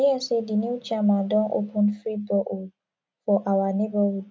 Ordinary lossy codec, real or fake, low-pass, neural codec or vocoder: none; real; none; none